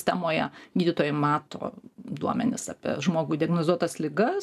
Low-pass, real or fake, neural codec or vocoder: 14.4 kHz; real; none